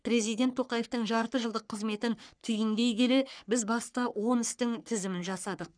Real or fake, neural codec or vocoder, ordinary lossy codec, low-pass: fake; codec, 44.1 kHz, 3.4 kbps, Pupu-Codec; none; 9.9 kHz